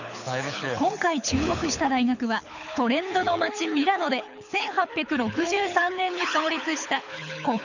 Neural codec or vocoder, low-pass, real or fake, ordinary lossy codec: codec, 24 kHz, 6 kbps, HILCodec; 7.2 kHz; fake; none